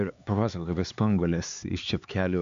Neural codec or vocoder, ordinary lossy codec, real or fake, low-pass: codec, 16 kHz, 4 kbps, X-Codec, HuBERT features, trained on LibriSpeech; AAC, 64 kbps; fake; 7.2 kHz